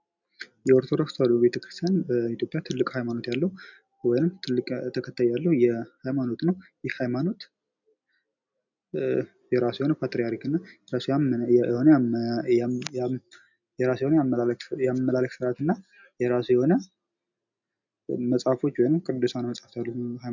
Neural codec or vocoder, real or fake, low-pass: none; real; 7.2 kHz